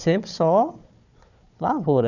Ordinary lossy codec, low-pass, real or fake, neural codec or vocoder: none; 7.2 kHz; fake; codec, 16 kHz, 4 kbps, FunCodec, trained on Chinese and English, 50 frames a second